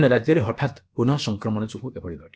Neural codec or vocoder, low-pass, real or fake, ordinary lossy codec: codec, 16 kHz, about 1 kbps, DyCAST, with the encoder's durations; none; fake; none